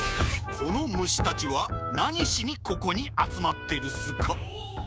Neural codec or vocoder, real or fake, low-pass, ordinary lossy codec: codec, 16 kHz, 6 kbps, DAC; fake; none; none